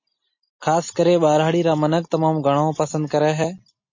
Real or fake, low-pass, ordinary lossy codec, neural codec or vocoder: real; 7.2 kHz; MP3, 32 kbps; none